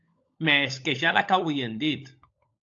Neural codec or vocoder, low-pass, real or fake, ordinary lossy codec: codec, 16 kHz, 16 kbps, FunCodec, trained on LibriTTS, 50 frames a second; 7.2 kHz; fake; AAC, 48 kbps